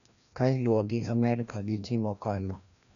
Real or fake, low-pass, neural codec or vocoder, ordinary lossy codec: fake; 7.2 kHz; codec, 16 kHz, 1 kbps, FreqCodec, larger model; none